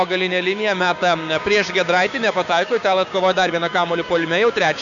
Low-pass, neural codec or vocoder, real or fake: 7.2 kHz; codec, 16 kHz, 6 kbps, DAC; fake